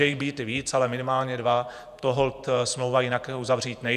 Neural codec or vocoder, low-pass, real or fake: vocoder, 48 kHz, 128 mel bands, Vocos; 14.4 kHz; fake